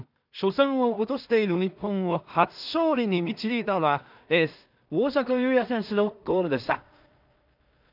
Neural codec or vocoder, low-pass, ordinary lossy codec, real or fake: codec, 16 kHz in and 24 kHz out, 0.4 kbps, LongCat-Audio-Codec, two codebook decoder; 5.4 kHz; none; fake